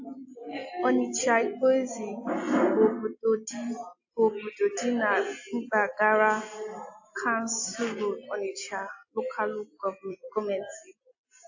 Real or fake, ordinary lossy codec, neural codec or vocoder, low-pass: real; AAC, 48 kbps; none; 7.2 kHz